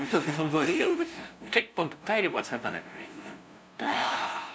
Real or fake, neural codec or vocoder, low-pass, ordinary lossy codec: fake; codec, 16 kHz, 0.5 kbps, FunCodec, trained on LibriTTS, 25 frames a second; none; none